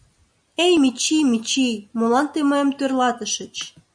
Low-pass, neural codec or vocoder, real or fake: 9.9 kHz; none; real